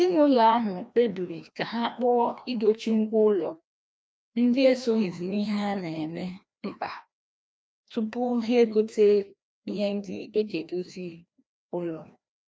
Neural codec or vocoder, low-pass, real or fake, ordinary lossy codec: codec, 16 kHz, 1 kbps, FreqCodec, larger model; none; fake; none